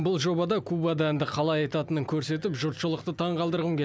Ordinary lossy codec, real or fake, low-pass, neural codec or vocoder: none; real; none; none